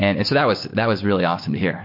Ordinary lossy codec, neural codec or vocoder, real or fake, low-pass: MP3, 32 kbps; none; real; 5.4 kHz